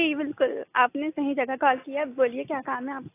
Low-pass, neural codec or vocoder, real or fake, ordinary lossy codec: 3.6 kHz; none; real; AAC, 24 kbps